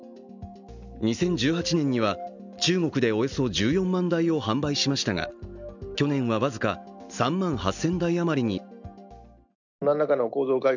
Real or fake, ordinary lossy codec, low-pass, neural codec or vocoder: real; none; 7.2 kHz; none